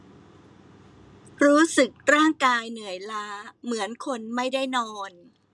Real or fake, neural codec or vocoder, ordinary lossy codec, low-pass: real; none; none; none